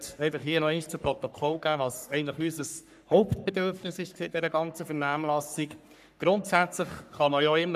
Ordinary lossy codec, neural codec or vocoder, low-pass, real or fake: none; codec, 44.1 kHz, 3.4 kbps, Pupu-Codec; 14.4 kHz; fake